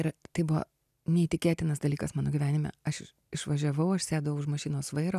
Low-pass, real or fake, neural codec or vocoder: 14.4 kHz; real; none